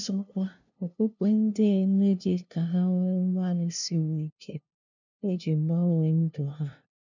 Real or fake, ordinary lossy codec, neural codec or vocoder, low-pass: fake; none; codec, 16 kHz, 0.5 kbps, FunCodec, trained on LibriTTS, 25 frames a second; 7.2 kHz